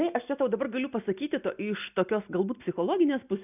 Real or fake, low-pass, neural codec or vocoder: real; 3.6 kHz; none